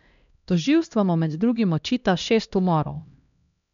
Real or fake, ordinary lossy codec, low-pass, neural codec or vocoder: fake; none; 7.2 kHz; codec, 16 kHz, 1 kbps, X-Codec, HuBERT features, trained on LibriSpeech